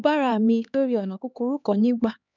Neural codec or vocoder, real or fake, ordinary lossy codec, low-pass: codec, 16 kHz, 2 kbps, X-Codec, HuBERT features, trained on LibriSpeech; fake; none; 7.2 kHz